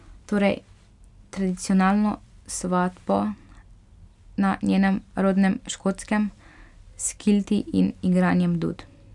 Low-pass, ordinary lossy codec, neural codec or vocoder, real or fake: 10.8 kHz; none; none; real